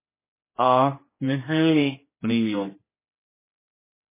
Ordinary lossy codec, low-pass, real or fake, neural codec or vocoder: MP3, 16 kbps; 3.6 kHz; fake; codec, 16 kHz, 1 kbps, X-Codec, HuBERT features, trained on general audio